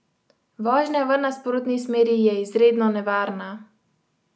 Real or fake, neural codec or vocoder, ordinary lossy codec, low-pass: real; none; none; none